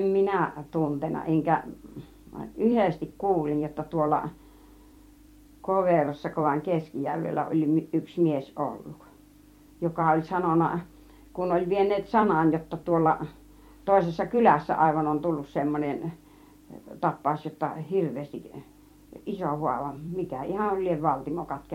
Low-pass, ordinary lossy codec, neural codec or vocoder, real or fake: 19.8 kHz; MP3, 64 kbps; vocoder, 44.1 kHz, 128 mel bands every 256 samples, BigVGAN v2; fake